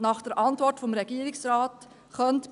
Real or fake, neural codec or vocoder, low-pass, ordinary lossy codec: real; none; 10.8 kHz; none